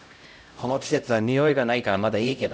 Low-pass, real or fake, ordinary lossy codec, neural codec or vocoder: none; fake; none; codec, 16 kHz, 0.5 kbps, X-Codec, HuBERT features, trained on LibriSpeech